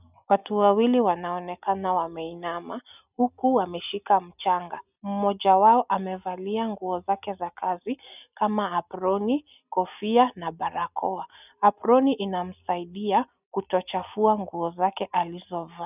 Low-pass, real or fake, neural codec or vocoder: 3.6 kHz; real; none